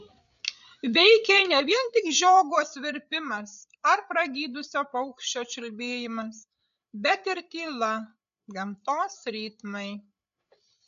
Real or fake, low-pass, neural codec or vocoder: fake; 7.2 kHz; codec, 16 kHz, 8 kbps, FreqCodec, larger model